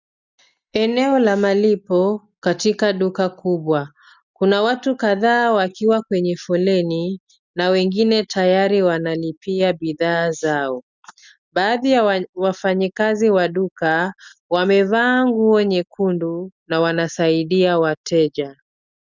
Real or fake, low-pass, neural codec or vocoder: real; 7.2 kHz; none